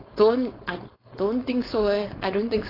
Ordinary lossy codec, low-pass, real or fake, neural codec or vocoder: AAC, 24 kbps; 5.4 kHz; fake; codec, 16 kHz, 4.8 kbps, FACodec